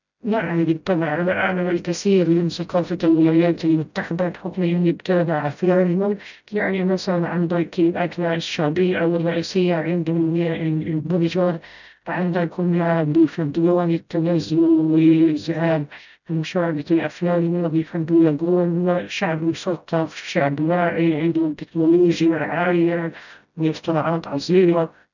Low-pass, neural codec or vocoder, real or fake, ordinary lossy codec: 7.2 kHz; codec, 16 kHz, 0.5 kbps, FreqCodec, smaller model; fake; none